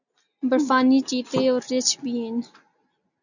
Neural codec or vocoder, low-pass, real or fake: none; 7.2 kHz; real